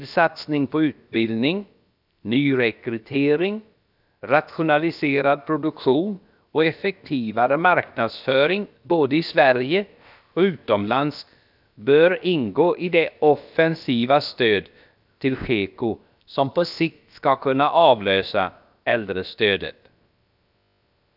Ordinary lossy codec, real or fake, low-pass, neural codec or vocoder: none; fake; 5.4 kHz; codec, 16 kHz, about 1 kbps, DyCAST, with the encoder's durations